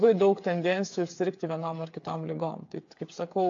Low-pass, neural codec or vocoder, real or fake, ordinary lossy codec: 7.2 kHz; codec, 16 kHz, 8 kbps, FreqCodec, smaller model; fake; AAC, 48 kbps